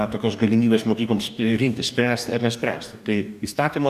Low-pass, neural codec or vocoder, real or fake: 14.4 kHz; codec, 44.1 kHz, 2.6 kbps, DAC; fake